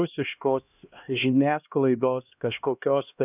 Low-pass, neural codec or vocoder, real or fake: 3.6 kHz; codec, 16 kHz, 1 kbps, X-Codec, HuBERT features, trained on LibriSpeech; fake